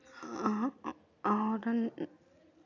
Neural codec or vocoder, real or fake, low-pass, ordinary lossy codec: none; real; 7.2 kHz; none